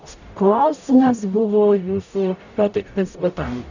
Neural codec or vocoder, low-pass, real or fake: codec, 44.1 kHz, 0.9 kbps, DAC; 7.2 kHz; fake